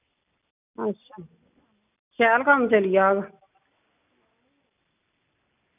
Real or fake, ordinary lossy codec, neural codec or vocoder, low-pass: real; none; none; 3.6 kHz